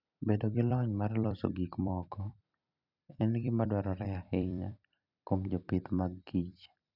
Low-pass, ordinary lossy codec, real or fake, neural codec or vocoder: 5.4 kHz; none; fake; vocoder, 44.1 kHz, 128 mel bands every 256 samples, BigVGAN v2